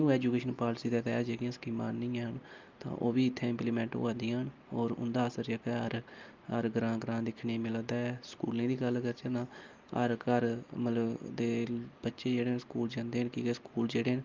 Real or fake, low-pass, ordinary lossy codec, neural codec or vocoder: real; 7.2 kHz; Opus, 32 kbps; none